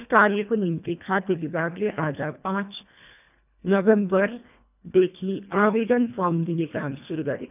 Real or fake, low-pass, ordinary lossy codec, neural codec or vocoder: fake; 3.6 kHz; none; codec, 24 kHz, 1.5 kbps, HILCodec